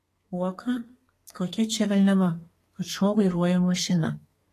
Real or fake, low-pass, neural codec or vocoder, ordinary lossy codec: fake; 14.4 kHz; codec, 32 kHz, 1.9 kbps, SNAC; AAC, 48 kbps